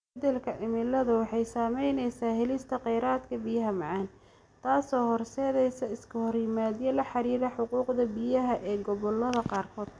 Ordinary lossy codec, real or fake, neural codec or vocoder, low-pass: none; real; none; 9.9 kHz